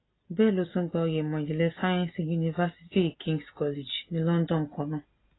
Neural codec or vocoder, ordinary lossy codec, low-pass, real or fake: none; AAC, 16 kbps; 7.2 kHz; real